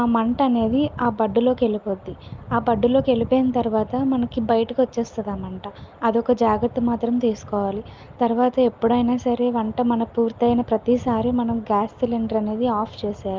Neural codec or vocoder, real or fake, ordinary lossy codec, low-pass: none; real; Opus, 32 kbps; 7.2 kHz